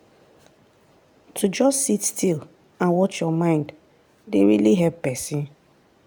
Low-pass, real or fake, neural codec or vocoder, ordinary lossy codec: none; real; none; none